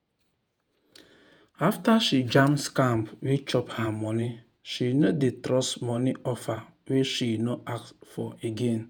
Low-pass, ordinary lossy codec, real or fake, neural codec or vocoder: none; none; fake; vocoder, 48 kHz, 128 mel bands, Vocos